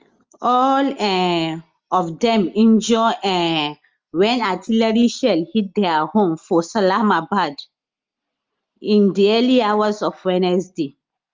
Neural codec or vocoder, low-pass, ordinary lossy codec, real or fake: none; 7.2 kHz; Opus, 32 kbps; real